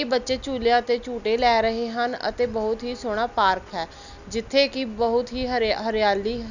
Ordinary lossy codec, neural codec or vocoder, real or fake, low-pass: none; none; real; 7.2 kHz